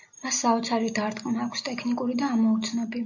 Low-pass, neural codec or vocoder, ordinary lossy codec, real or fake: 7.2 kHz; none; AAC, 48 kbps; real